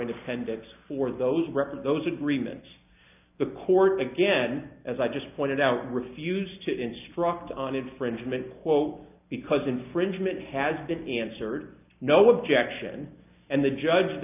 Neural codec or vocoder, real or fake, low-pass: none; real; 3.6 kHz